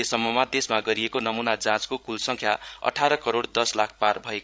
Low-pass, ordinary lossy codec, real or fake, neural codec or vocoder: none; none; fake; codec, 16 kHz, 16 kbps, FreqCodec, larger model